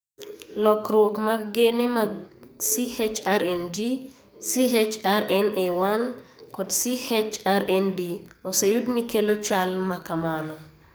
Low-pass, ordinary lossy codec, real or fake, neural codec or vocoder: none; none; fake; codec, 44.1 kHz, 2.6 kbps, SNAC